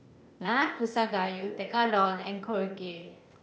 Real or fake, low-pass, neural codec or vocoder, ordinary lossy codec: fake; none; codec, 16 kHz, 0.8 kbps, ZipCodec; none